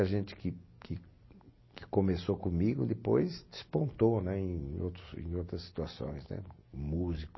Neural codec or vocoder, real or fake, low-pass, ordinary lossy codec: none; real; 7.2 kHz; MP3, 24 kbps